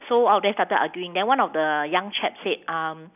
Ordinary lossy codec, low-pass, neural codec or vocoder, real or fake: none; 3.6 kHz; none; real